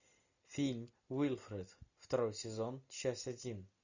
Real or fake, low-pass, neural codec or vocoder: real; 7.2 kHz; none